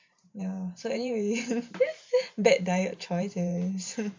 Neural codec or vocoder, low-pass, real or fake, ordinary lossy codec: vocoder, 44.1 kHz, 128 mel bands every 512 samples, BigVGAN v2; 7.2 kHz; fake; MP3, 48 kbps